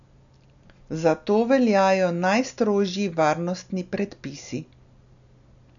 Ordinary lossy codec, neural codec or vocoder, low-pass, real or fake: none; none; 7.2 kHz; real